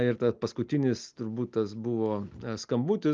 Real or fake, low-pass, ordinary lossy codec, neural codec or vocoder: real; 7.2 kHz; Opus, 32 kbps; none